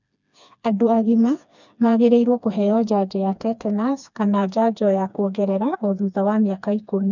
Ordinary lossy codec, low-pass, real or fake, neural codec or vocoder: none; 7.2 kHz; fake; codec, 16 kHz, 2 kbps, FreqCodec, smaller model